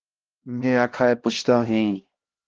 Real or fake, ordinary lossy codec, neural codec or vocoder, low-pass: fake; Opus, 16 kbps; codec, 16 kHz, 1 kbps, X-Codec, HuBERT features, trained on LibriSpeech; 7.2 kHz